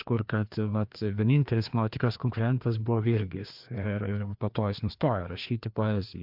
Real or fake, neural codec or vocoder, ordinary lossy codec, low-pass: fake; codec, 16 kHz, 2 kbps, FreqCodec, larger model; MP3, 48 kbps; 5.4 kHz